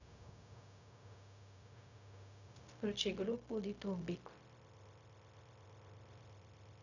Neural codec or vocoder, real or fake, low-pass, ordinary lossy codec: codec, 16 kHz, 0.4 kbps, LongCat-Audio-Codec; fake; 7.2 kHz; none